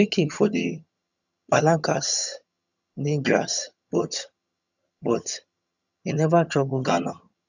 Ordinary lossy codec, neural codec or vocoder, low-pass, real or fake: none; vocoder, 22.05 kHz, 80 mel bands, HiFi-GAN; 7.2 kHz; fake